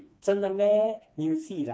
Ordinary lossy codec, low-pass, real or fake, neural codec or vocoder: none; none; fake; codec, 16 kHz, 2 kbps, FreqCodec, smaller model